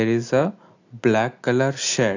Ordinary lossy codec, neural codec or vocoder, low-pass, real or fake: AAC, 48 kbps; none; 7.2 kHz; real